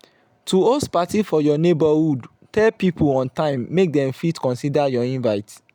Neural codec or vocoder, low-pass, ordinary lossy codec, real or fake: none; 19.8 kHz; none; real